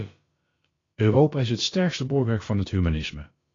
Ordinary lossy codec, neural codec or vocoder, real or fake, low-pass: AAC, 32 kbps; codec, 16 kHz, about 1 kbps, DyCAST, with the encoder's durations; fake; 7.2 kHz